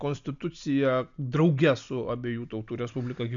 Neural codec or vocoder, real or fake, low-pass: none; real; 7.2 kHz